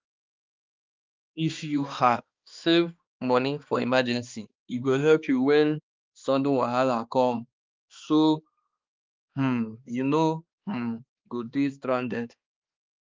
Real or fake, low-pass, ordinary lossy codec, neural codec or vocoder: fake; 7.2 kHz; Opus, 24 kbps; codec, 16 kHz, 2 kbps, X-Codec, HuBERT features, trained on balanced general audio